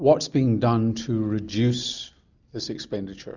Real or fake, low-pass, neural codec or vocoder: real; 7.2 kHz; none